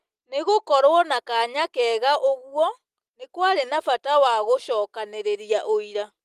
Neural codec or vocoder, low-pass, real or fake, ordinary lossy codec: none; 14.4 kHz; real; Opus, 24 kbps